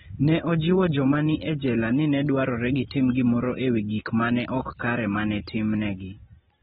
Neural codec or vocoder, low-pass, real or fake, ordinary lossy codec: none; 14.4 kHz; real; AAC, 16 kbps